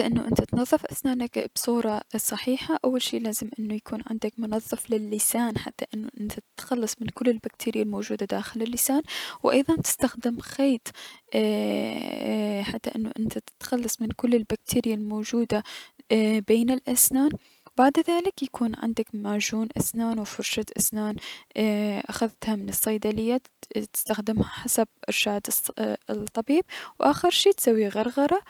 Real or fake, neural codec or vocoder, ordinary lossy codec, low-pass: real; none; none; 19.8 kHz